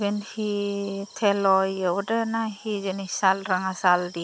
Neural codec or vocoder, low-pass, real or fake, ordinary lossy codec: none; none; real; none